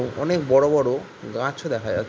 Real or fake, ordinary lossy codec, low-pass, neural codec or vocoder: real; none; none; none